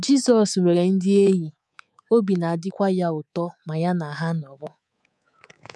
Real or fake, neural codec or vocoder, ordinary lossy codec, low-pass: fake; codec, 24 kHz, 3.1 kbps, DualCodec; none; none